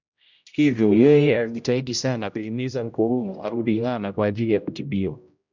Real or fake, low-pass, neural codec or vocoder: fake; 7.2 kHz; codec, 16 kHz, 0.5 kbps, X-Codec, HuBERT features, trained on general audio